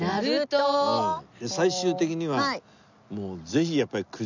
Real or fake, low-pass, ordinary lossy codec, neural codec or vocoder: real; 7.2 kHz; none; none